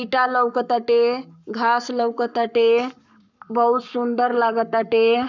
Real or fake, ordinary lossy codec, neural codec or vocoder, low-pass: fake; none; codec, 44.1 kHz, 7.8 kbps, Pupu-Codec; 7.2 kHz